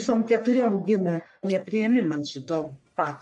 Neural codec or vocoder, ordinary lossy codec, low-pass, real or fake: codec, 44.1 kHz, 1.7 kbps, Pupu-Codec; MP3, 64 kbps; 10.8 kHz; fake